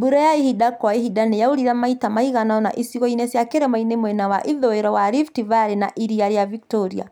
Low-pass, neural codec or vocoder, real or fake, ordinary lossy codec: 19.8 kHz; none; real; none